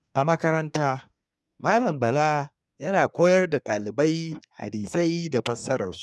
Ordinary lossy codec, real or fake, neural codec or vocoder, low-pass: none; fake; codec, 24 kHz, 1 kbps, SNAC; none